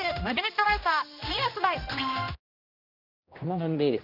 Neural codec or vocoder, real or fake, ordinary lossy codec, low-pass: codec, 16 kHz, 1 kbps, X-Codec, HuBERT features, trained on general audio; fake; none; 5.4 kHz